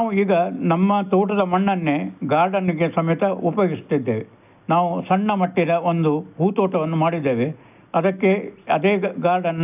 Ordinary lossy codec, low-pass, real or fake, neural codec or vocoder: none; 3.6 kHz; real; none